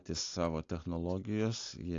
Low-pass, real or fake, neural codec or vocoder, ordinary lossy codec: 7.2 kHz; real; none; AAC, 48 kbps